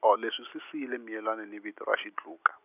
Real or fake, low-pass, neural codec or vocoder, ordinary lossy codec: real; 3.6 kHz; none; none